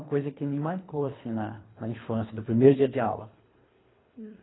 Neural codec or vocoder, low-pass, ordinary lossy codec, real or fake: codec, 24 kHz, 3 kbps, HILCodec; 7.2 kHz; AAC, 16 kbps; fake